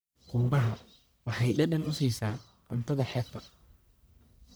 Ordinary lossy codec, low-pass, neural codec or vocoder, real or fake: none; none; codec, 44.1 kHz, 1.7 kbps, Pupu-Codec; fake